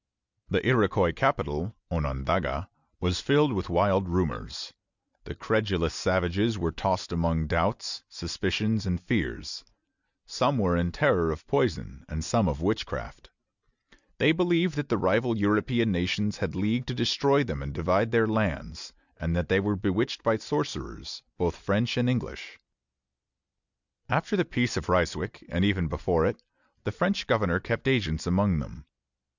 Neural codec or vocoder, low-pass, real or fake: none; 7.2 kHz; real